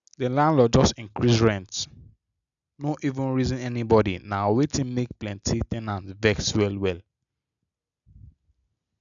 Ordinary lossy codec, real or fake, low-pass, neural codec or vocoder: none; real; 7.2 kHz; none